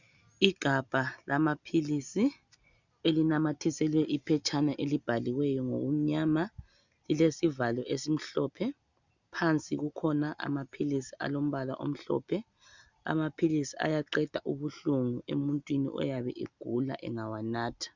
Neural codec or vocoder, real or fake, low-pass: none; real; 7.2 kHz